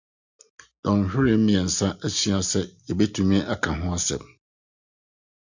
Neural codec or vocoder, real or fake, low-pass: none; real; 7.2 kHz